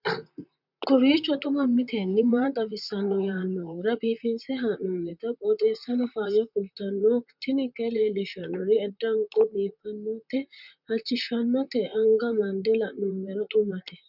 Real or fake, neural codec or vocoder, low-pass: fake; vocoder, 44.1 kHz, 128 mel bands, Pupu-Vocoder; 5.4 kHz